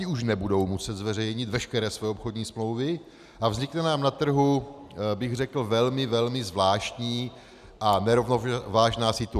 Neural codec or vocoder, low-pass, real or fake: none; 14.4 kHz; real